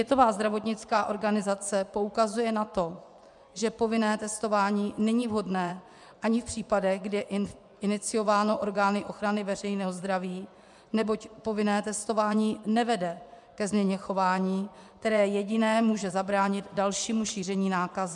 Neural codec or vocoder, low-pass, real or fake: vocoder, 24 kHz, 100 mel bands, Vocos; 10.8 kHz; fake